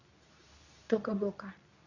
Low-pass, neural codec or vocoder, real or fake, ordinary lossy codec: 7.2 kHz; codec, 24 kHz, 0.9 kbps, WavTokenizer, medium speech release version 2; fake; none